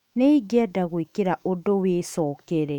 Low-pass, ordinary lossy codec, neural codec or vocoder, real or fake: 19.8 kHz; none; autoencoder, 48 kHz, 128 numbers a frame, DAC-VAE, trained on Japanese speech; fake